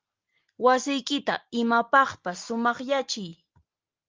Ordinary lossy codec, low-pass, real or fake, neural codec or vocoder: Opus, 24 kbps; 7.2 kHz; real; none